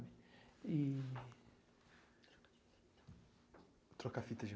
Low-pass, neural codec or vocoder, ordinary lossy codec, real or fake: none; none; none; real